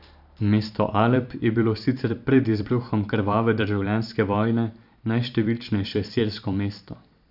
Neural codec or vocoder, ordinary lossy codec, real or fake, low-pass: vocoder, 44.1 kHz, 128 mel bands every 512 samples, BigVGAN v2; none; fake; 5.4 kHz